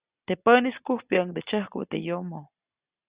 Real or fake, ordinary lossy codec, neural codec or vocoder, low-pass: real; Opus, 64 kbps; none; 3.6 kHz